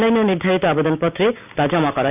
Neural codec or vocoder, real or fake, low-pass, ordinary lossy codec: none; real; 3.6 kHz; none